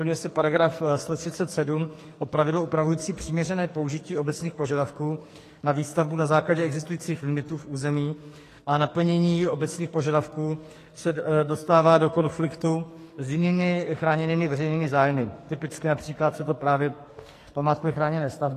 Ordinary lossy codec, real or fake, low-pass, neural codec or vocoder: AAC, 48 kbps; fake; 14.4 kHz; codec, 44.1 kHz, 2.6 kbps, SNAC